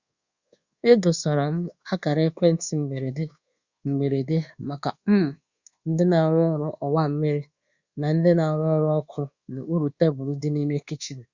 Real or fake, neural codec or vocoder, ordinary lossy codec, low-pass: fake; codec, 24 kHz, 1.2 kbps, DualCodec; Opus, 64 kbps; 7.2 kHz